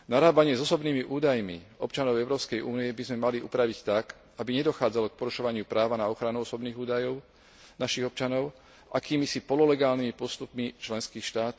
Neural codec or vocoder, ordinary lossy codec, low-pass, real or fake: none; none; none; real